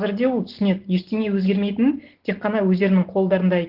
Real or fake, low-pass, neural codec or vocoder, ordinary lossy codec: real; 5.4 kHz; none; Opus, 16 kbps